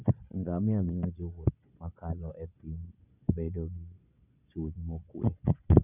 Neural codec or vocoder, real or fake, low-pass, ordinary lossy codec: vocoder, 22.05 kHz, 80 mel bands, Vocos; fake; 3.6 kHz; none